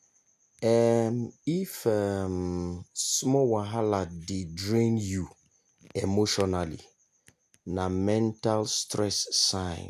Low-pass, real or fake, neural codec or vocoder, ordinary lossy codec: 14.4 kHz; real; none; none